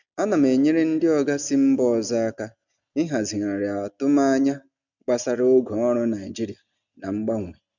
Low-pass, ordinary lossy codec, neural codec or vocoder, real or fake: 7.2 kHz; none; none; real